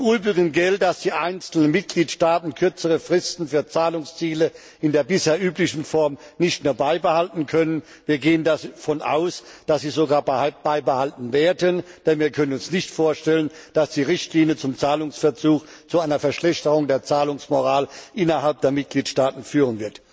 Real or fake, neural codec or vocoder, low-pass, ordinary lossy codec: real; none; none; none